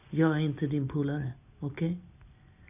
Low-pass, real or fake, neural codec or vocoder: 3.6 kHz; real; none